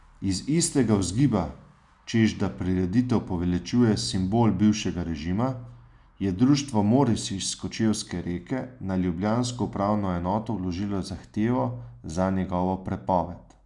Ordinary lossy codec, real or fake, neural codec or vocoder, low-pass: none; real; none; 10.8 kHz